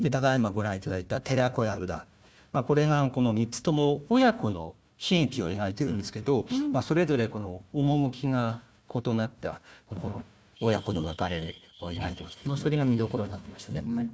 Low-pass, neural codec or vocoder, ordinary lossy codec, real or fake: none; codec, 16 kHz, 1 kbps, FunCodec, trained on Chinese and English, 50 frames a second; none; fake